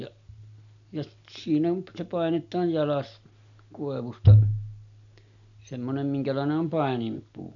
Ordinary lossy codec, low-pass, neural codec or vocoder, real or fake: none; 7.2 kHz; none; real